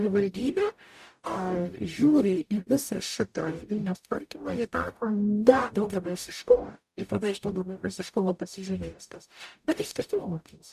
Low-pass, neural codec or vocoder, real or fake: 14.4 kHz; codec, 44.1 kHz, 0.9 kbps, DAC; fake